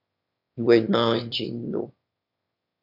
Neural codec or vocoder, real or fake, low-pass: autoencoder, 22.05 kHz, a latent of 192 numbers a frame, VITS, trained on one speaker; fake; 5.4 kHz